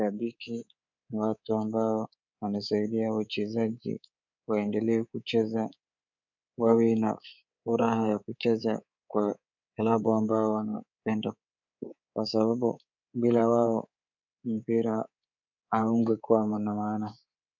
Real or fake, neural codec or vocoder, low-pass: fake; codec, 24 kHz, 3.1 kbps, DualCodec; 7.2 kHz